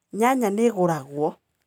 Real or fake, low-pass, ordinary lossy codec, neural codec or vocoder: real; 19.8 kHz; none; none